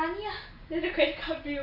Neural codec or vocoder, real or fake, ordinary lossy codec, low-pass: none; real; none; 5.4 kHz